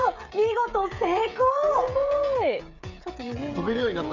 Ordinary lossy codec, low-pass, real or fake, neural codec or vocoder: none; 7.2 kHz; fake; codec, 16 kHz, 16 kbps, FreqCodec, smaller model